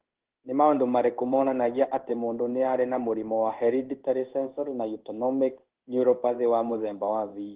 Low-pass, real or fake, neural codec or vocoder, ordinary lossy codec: 3.6 kHz; fake; codec, 16 kHz in and 24 kHz out, 1 kbps, XY-Tokenizer; Opus, 16 kbps